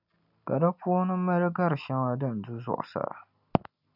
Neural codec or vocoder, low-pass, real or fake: none; 5.4 kHz; real